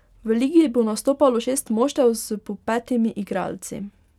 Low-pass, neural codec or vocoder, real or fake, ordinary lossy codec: 19.8 kHz; none; real; none